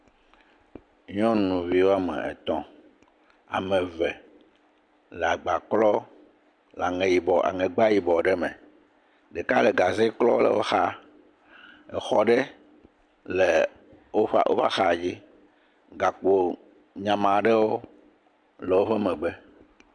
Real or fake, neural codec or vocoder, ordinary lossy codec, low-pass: real; none; MP3, 96 kbps; 9.9 kHz